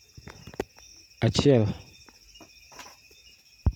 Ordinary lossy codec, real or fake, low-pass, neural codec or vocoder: none; real; 19.8 kHz; none